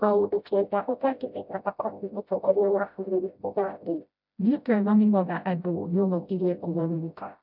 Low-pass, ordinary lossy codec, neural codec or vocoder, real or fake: 5.4 kHz; none; codec, 16 kHz, 0.5 kbps, FreqCodec, smaller model; fake